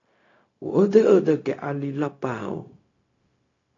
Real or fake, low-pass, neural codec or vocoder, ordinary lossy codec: fake; 7.2 kHz; codec, 16 kHz, 0.4 kbps, LongCat-Audio-Codec; AAC, 32 kbps